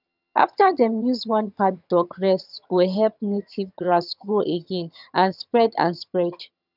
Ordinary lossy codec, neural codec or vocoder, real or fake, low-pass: none; vocoder, 22.05 kHz, 80 mel bands, HiFi-GAN; fake; 5.4 kHz